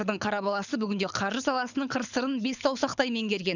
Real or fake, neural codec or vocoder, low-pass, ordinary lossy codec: fake; codec, 24 kHz, 6 kbps, HILCodec; 7.2 kHz; none